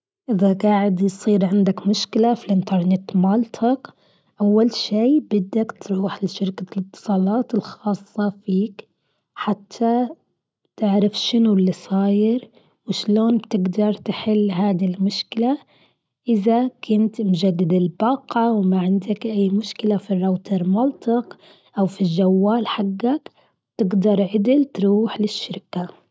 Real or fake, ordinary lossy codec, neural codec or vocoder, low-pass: real; none; none; none